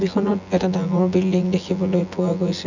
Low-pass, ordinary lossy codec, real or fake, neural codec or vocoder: 7.2 kHz; none; fake; vocoder, 24 kHz, 100 mel bands, Vocos